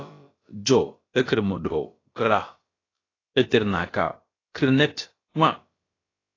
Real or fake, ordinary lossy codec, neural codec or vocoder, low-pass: fake; AAC, 32 kbps; codec, 16 kHz, about 1 kbps, DyCAST, with the encoder's durations; 7.2 kHz